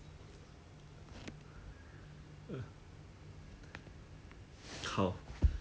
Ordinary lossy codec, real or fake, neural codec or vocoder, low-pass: none; real; none; none